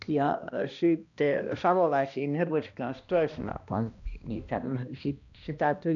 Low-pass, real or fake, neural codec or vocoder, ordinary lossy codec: 7.2 kHz; fake; codec, 16 kHz, 1 kbps, X-Codec, HuBERT features, trained on balanced general audio; none